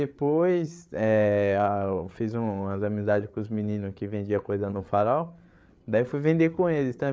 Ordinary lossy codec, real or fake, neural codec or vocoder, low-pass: none; fake; codec, 16 kHz, 8 kbps, FreqCodec, larger model; none